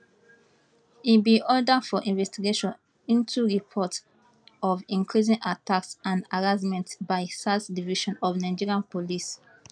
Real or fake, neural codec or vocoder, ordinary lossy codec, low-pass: real; none; none; 9.9 kHz